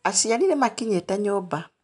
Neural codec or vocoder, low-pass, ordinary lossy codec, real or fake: none; 10.8 kHz; none; real